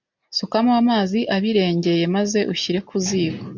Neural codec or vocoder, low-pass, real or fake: none; 7.2 kHz; real